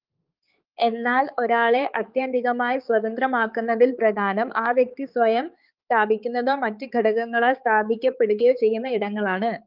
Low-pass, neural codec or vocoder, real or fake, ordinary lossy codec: 5.4 kHz; codec, 16 kHz, 4 kbps, X-Codec, HuBERT features, trained on balanced general audio; fake; Opus, 32 kbps